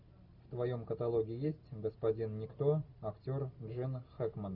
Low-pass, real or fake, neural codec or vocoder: 5.4 kHz; real; none